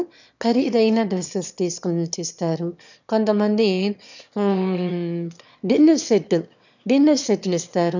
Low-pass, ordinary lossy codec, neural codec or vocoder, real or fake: 7.2 kHz; none; autoencoder, 22.05 kHz, a latent of 192 numbers a frame, VITS, trained on one speaker; fake